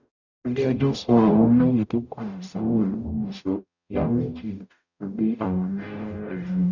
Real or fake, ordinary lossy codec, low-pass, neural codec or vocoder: fake; none; 7.2 kHz; codec, 44.1 kHz, 0.9 kbps, DAC